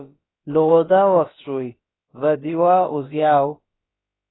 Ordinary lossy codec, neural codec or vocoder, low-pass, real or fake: AAC, 16 kbps; codec, 16 kHz, about 1 kbps, DyCAST, with the encoder's durations; 7.2 kHz; fake